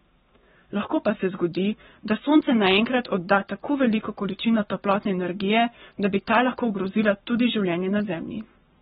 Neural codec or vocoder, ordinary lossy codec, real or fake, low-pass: codec, 44.1 kHz, 7.8 kbps, Pupu-Codec; AAC, 16 kbps; fake; 19.8 kHz